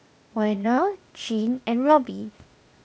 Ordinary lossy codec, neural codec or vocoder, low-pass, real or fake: none; codec, 16 kHz, 0.8 kbps, ZipCodec; none; fake